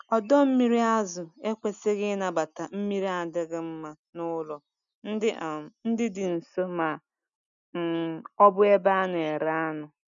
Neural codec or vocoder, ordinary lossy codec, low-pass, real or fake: none; none; 7.2 kHz; real